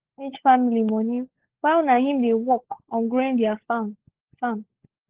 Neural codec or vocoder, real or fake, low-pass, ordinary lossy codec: codec, 16 kHz, 16 kbps, FunCodec, trained on LibriTTS, 50 frames a second; fake; 3.6 kHz; Opus, 16 kbps